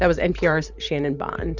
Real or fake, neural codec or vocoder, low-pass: fake; vocoder, 44.1 kHz, 128 mel bands every 512 samples, BigVGAN v2; 7.2 kHz